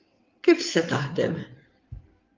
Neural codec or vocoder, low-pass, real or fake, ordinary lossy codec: codec, 16 kHz in and 24 kHz out, 2.2 kbps, FireRedTTS-2 codec; 7.2 kHz; fake; Opus, 32 kbps